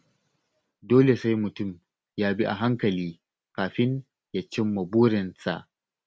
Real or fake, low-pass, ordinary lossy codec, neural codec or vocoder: real; none; none; none